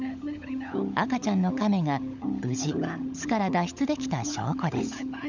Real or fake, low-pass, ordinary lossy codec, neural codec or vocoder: fake; 7.2 kHz; none; codec, 16 kHz, 16 kbps, FunCodec, trained on LibriTTS, 50 frames a second